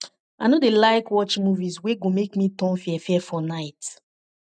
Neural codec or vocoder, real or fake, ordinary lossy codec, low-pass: none; real; none; 9.9 kHz